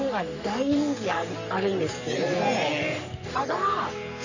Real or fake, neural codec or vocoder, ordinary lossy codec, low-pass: fake; codec, 44.1 kHz, 3.4 kbps, Pupu-Codec; Opus, 64 kbps; 7.2 kHz